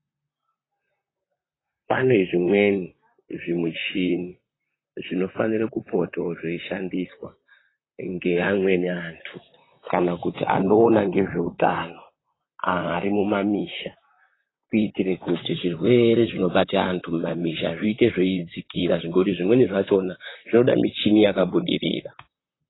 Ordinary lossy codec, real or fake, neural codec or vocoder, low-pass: AAC, 16 kbps; fake; vocoder, 44.1 kHz, 128 mel bands, Pupu-Vocoder; 7.2 kHz